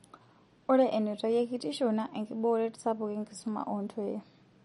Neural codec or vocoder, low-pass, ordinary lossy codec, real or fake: none; 19.8 kHz; MP3, 48 kbps; real